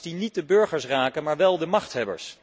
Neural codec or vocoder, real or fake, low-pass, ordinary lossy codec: none; real; none; none